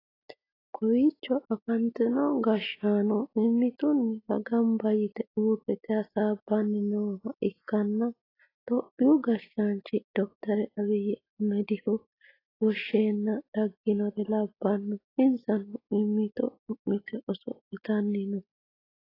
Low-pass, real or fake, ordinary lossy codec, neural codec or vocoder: 5.4 kHz; real; AAC, 24 kbps; none